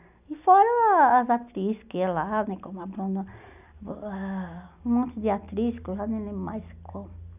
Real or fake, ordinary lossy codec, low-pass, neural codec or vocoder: real; none; 3.6 kHz; none